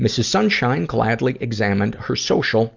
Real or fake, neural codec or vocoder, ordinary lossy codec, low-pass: real; none; Opus, 64 kbps; 7.2 kHz